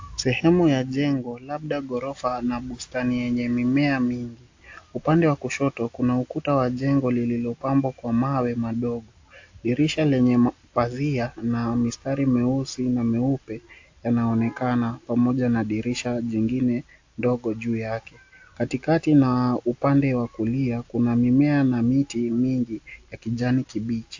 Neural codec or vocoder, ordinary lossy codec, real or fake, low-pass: none; AAC, 48 kbps; real; 7.2 kHz